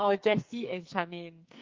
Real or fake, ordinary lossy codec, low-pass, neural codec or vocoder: fake; Opus, 24 kbps; 7.2 kHz; codec, 44.1 kHz, 2.6 kbps, SNAC